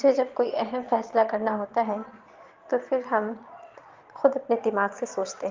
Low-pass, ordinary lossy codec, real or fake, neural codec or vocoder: 7.2 kHz; Opus, 32 kbps; fake; vocoder, 22.05 kHz, 80 mel bands, Vocos